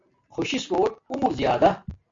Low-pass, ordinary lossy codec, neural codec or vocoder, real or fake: 7.2 kHz; MP3, 48 kbps; none; real